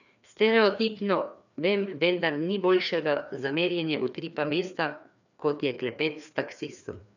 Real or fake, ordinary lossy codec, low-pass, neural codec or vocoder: fake; none; 7.2 kHz; codec, 16 kHz, 2 kbps, FreqCodec, larger model